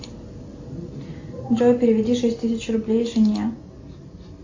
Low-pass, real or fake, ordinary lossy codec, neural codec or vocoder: 7.2 kHz; real; AAC, 48 kbps; none